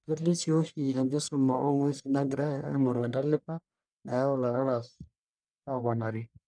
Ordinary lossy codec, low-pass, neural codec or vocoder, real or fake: none; 9.9 kHz; codec, 44.1 kHz, 1.7 kbps, Pupu-Codec; fake